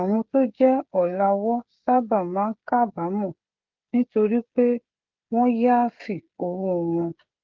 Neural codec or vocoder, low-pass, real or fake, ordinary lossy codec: codec, 16 kHz, 16 kbps, FreqCodec, smaller model; 7.2 kHz; fake; Opus, 16 kbps